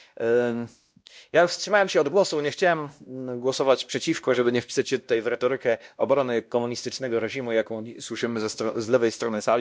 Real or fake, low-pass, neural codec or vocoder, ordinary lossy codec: fake; none; codec, 16 kHz, 1 kbps, X-Codec, WavLM features, trained on Multilingual LibriSpeech; none